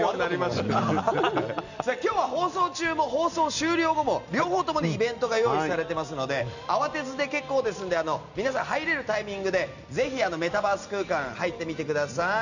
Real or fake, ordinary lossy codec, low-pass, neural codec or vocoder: real; none; 7.2 kHz; none